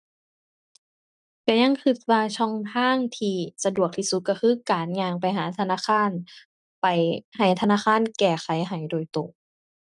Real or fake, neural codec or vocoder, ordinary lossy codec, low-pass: real; none; none; 10.8 kHz